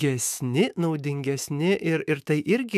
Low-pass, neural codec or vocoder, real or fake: 14.4 kHz; autoencoder, 48 kHz, 128 numbers a frame, DAC-VAE, trained on Japanese speech; fake